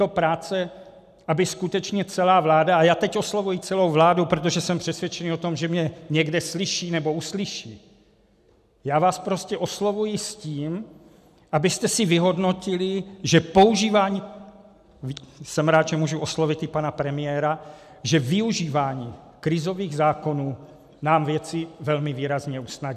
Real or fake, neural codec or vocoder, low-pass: real; none; 14.4 kHz